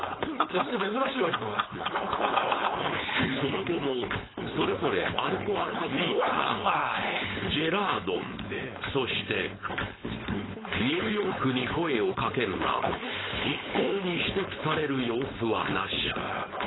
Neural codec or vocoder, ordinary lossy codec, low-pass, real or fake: codec, 16 kHz, 4.8 kbps, FACodec; AAC, 16 kbps; 7.2 kHz; fake